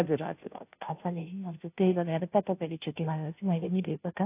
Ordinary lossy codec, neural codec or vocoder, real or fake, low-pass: AAC, 24 kbps; codec, 16 kHz, 0.5 kbps, FunCodec, trained on Chinese and English, 25 frames a second; fake; 3.6 kHz